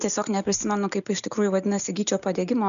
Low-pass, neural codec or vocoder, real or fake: 7.2 kHz; none; real